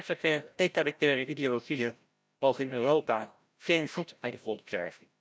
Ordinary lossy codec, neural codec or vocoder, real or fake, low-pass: none; codec, 16 kHz, 0.5 kbps, FreqCodec, larger model; fake; none